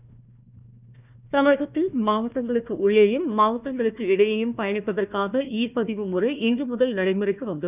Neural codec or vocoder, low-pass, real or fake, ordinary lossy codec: codec, 16 kHz, 1 kbps, FunCodec, trained on Chinese and English, 50 frames a second; 3.6 kHz; fake; none